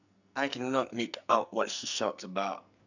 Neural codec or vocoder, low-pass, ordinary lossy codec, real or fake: codec, 44.1 kHz, 2.6 kbps, SNAC; 7.2 kHz; none; fake